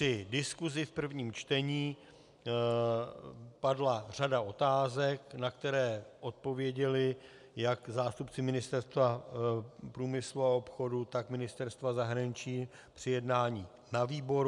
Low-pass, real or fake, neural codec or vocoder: 10.8 kHz; real; none